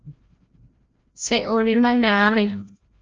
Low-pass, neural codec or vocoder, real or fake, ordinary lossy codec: 7.2 kHz; codec, 16 kHz, 0.5 kbps, FreqCodec, larger model; fake; Opus, 24 kbps